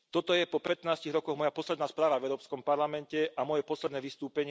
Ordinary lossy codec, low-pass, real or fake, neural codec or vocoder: none; none; real; none